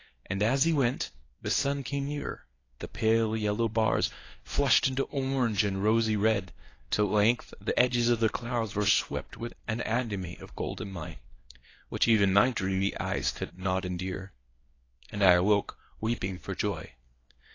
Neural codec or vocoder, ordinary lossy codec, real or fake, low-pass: codec, 24 kHz, 0.9 kbps, WavTokenizer, medium speech release version 1; AAC, 32 kbps; fake; 7.2 kHz